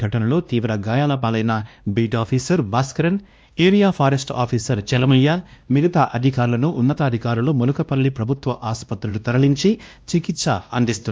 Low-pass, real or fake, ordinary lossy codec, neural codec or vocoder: none; fake; none; codec, 16 kHz, 1 kbps, X-Codec, WavLM features, trained on Multilingual LibriSpeech